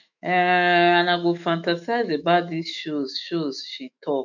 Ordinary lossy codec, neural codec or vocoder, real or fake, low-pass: none; autoencoder, 48 kHz, 128 numbers a frame, DAC-VAE, trained on Japanese speech; fake; 7.2 kHz